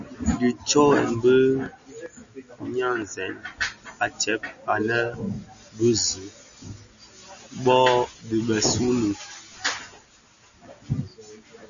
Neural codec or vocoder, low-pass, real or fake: none; 7.2 kHz; real